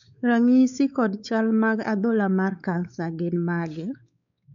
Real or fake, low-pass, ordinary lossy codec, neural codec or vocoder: fake; 7.2 kHz; none; codec, 16 kHz, 4 kbps, X-Codec, WavLM features, trained on Multilingual LibriSpeech